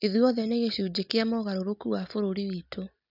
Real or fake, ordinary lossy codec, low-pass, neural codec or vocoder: real; none; 5.4 kHz; none